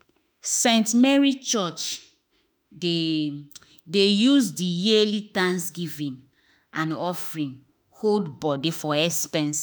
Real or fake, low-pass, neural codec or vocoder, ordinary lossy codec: fake; none; autoencoder, 48 kHz, 32 numbers a frame, DAC-VAE, trained on Japanese speech; none